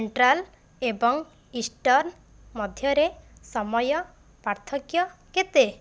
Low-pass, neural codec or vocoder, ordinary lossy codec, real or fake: none; none; none; real